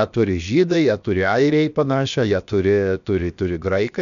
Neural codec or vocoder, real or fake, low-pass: codec, 16 kHz, 0.7 kbps, FocalCodec; fake; 7.2 kHz